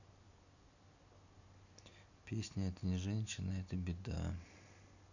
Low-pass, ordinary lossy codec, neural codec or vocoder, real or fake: 7.2 kHz; none; none; real